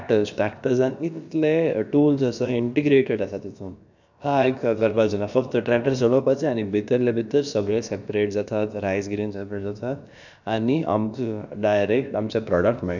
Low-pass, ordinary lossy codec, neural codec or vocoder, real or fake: 7.2 kHz; none; codec, 16 kHz, about 1 kbps, DyCAST, with the encoder's durations; fake